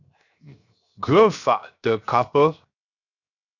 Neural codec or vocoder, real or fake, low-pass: codec, 16 kHz, 0.7 kbps, FocalCodec; fake; 7.2 kHz